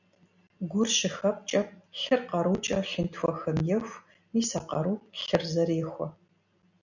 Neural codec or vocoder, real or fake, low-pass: none; real; 7.2 kHz